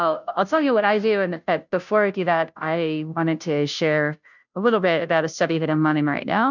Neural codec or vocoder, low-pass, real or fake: codec, 16 kHz, 0.5 kbps, FunCodec, trained on Chinese and English, 25 frames a second; 7.2 kHz; fake